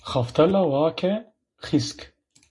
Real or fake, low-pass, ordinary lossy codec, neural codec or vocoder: real; 10.8 kHz; MP3, 48 kbps; none